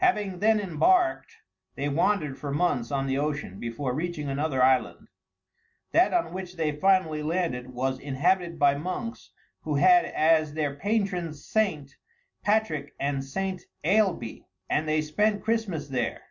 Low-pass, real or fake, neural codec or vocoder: 7.2 kHz; real; none